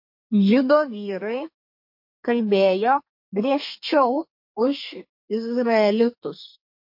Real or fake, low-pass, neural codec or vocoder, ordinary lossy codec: fake; 5.4 kHz; codec, 32 kHz, 1.9 kbps, SNAC; MP3, 32 kbps